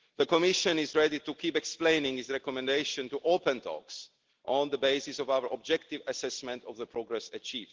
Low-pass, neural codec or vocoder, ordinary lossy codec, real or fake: 7.2 kHz; none; Opus, 16 kbps; real